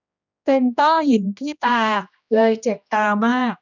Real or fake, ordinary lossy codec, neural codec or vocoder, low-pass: fake; none; codec, 16 kHz, 1 kbps, X-Codec, HuBERT features, trained on general audio; 7.2 kHz